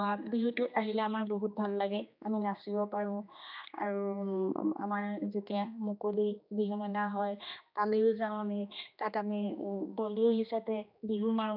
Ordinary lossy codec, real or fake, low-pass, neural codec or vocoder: none; fake; 5.4 kHz; codec, 16 kHz, 2 kbps, X-Codec, HuBERT features, trained on general audio